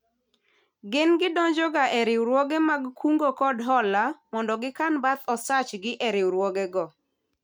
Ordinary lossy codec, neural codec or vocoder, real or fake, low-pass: none; none; real; 19.8 kHz